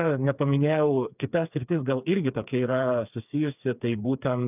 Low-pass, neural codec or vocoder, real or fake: 3.6 kHz; codec, 16 kHz, 4 kbps, FreqCodec, smaller model; fake